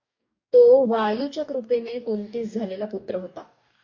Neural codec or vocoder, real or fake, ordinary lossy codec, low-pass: codec, 44.1 kHz, 2.6 kbps, DAC; fake; MP3, 48 kbps; 7.2 kHz